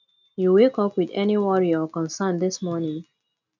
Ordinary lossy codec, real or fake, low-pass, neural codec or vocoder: none; real; 7.2 kHz; none